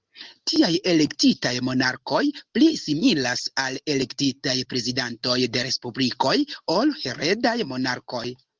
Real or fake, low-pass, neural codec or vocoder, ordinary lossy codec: real; 7.2 kHz; none; Opus, 24 kbps